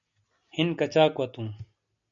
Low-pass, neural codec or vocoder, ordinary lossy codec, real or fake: 7.2 kHz; none; MP3, 96 kbps; real